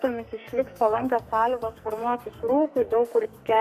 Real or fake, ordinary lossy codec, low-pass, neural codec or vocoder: fake; MP3, 64 kbps; 14.4 kHz; codec, 44.1 kHz, 2.6 kbps, SNAC